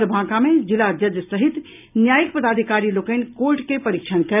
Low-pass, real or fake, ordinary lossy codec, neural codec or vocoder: 3.6 kHz; real; none; none